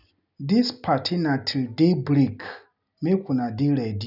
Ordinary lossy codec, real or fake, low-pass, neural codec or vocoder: none; real; 5.4 kHz; none